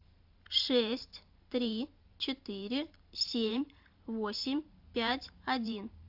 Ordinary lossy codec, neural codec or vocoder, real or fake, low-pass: AAC, 48 kbps; vocoder, 44.1 kHz, 128 mel bands every 512 samples, BigVGAN v2; fake; 5.4 kHz